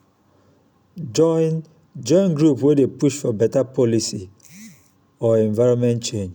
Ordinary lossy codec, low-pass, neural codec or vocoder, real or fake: none; none; none; real